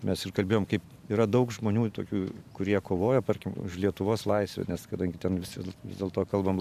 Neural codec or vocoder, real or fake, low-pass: vocoder, 44.1 kHz, 128 mel bands every 512 samples, BigVGAN v2; fake; 14.4 kHz